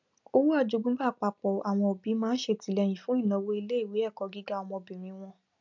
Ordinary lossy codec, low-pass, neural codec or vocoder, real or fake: none; 7.2 kHz; none; real